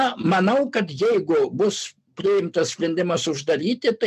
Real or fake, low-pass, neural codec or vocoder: real; 14.4 kHz; none